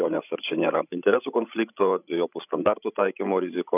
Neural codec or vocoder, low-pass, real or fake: codec, 16 kHz, 16 kbps, FreqCodec, larger model; 3.6 kHz; fake